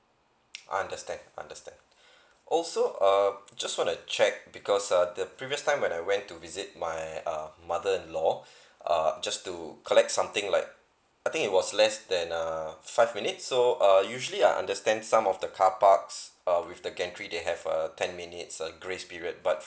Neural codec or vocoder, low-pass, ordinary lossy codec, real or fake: none; none; none; real